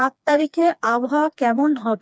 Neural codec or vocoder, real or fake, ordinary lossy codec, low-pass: codec, 16 kHz, 2 kbps, FreqCodec, smaller model; fake; none; none